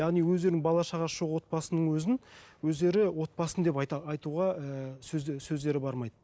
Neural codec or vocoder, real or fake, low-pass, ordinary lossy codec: none; real; none; none